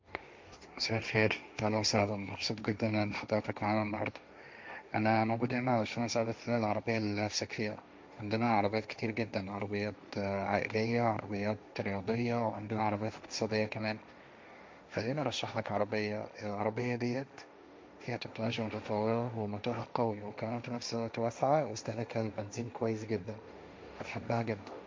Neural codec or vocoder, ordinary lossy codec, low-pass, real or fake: codec, 16 kHz, 1.1 kbps, Voila-Tokenizer; none; none; fake